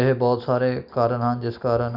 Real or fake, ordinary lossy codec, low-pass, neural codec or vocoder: real; none; 5.4 kHz; none